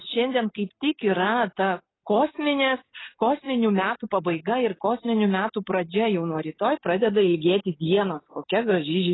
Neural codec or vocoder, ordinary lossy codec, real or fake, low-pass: none; AAC, 16 kbps; real; 7.2 kHz